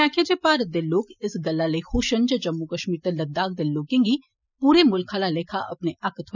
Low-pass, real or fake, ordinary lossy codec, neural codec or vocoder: 7.2 kHz; real; none; none